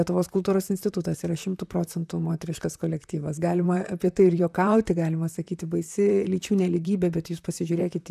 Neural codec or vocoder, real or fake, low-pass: vocoder, 44.1 kHz, 128 mel bands, Pupu-Vocoder; fake; 14.4 kHz